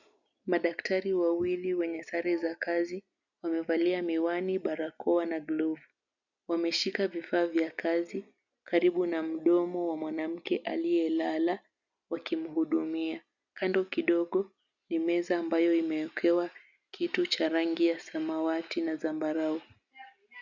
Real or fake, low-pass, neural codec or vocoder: real; 7.2 kHz; none